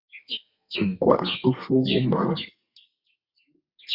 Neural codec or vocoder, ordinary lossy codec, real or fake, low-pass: codec, 32 kHz, 1.9 kbps, SNAC; Opus, 64 kbps; fake; 5.4 kHz